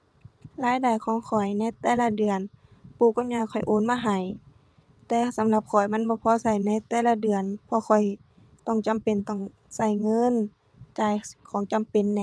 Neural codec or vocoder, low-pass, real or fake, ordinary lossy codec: vocoder, 22.05 kHz, 80 mel bands, WaveNeXt; none; fake; none